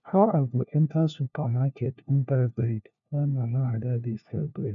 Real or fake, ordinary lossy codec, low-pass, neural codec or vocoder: fake; none; 7.2 kHz; codec, 16 kHz, 1 kbps, FunCodec, trained on LibriTTS, 50 frames a second